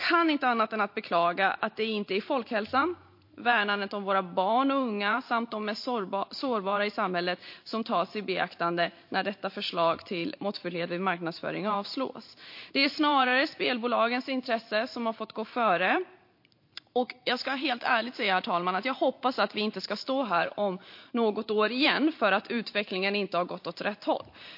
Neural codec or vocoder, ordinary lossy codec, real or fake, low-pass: vocoder, 44.1 kHz, 128 mel bands every 512 samples, BigVGAN v2; MP3, 32 kbps; fake; 5.4 kHz